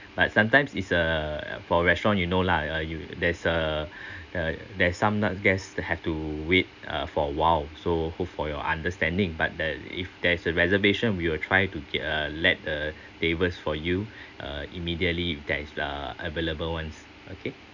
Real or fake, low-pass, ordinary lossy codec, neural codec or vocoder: real; 7.2 kHz; none; none